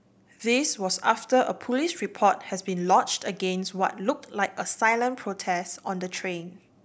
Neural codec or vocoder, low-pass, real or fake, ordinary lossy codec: none; none; real; none